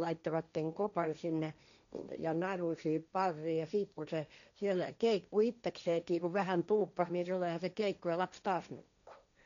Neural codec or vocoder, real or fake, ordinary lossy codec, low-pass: codec, 16 kHz, 1.1 kbps, Voila-Tokenizer; fake; none; 7.2 kHz